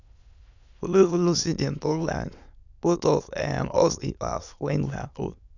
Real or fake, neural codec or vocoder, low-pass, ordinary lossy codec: fake; autoencoder, 22.05 kHz, a latent of 192 numbers a frame, VITS, trained on many speakers; 7.2 kHz; none